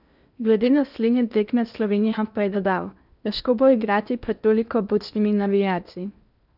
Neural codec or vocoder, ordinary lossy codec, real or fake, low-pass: codec, 16 kHz in and 24 kHz out, 0.8 kbps, FocalCodec, streaming, 65536 codes; none; fake; 5.4 kHz